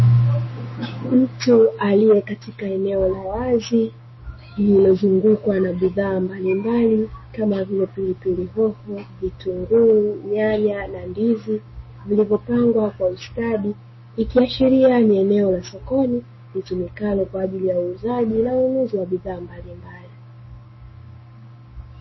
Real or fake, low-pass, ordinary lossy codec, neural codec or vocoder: fake; 7.2 kHz; MP3, 24 kbps; codec, 16 kHz, 6 kbps, DAC